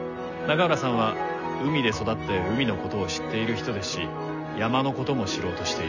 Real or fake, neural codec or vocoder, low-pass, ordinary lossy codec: real; none; 7.2 kHz; none